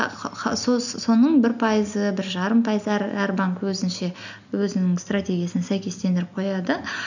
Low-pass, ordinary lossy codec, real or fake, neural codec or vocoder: 7.2 kHz; none; real; none